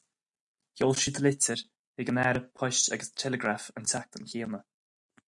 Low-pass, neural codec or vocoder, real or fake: 10.8 kHz; none; real